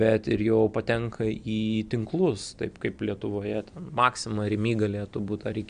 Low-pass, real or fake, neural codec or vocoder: 9.9 kHz; real; none